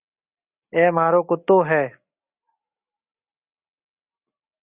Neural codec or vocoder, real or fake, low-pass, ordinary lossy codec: none; real; 3.6 kHz; Opus, 64 kbps